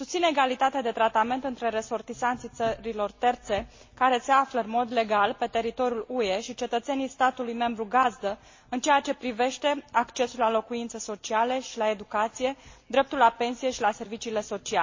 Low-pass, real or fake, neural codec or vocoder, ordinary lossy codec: 7.2 kHz; real; none; MP3, 32 kbps